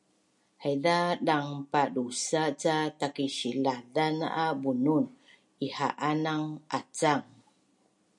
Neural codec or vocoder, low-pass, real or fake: none; 10.8 kHz; real